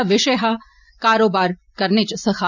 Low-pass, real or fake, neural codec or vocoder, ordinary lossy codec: 7.2 kHz; real; none; none